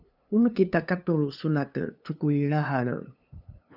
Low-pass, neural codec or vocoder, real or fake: 5.4 kHz; codec, 16 kHz, 2 kbps, FunCodec, trained on LibriTTS, 25 frames a second; fake